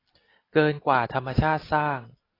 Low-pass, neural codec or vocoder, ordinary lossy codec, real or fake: 5.4 kHz; none; AAC, 24 kbps; real